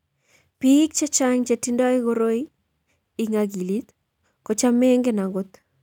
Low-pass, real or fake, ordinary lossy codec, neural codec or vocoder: 19.8 kHz; real; none; none